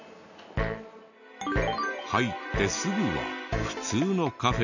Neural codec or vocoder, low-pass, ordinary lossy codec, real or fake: none; 7.2 kHz; none; real